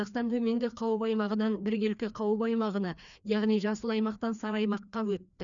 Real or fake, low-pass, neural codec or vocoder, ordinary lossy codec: fake; 7.2 kHz; codec, 16 kHz, 2 kbps, FreqCodec, larger model; none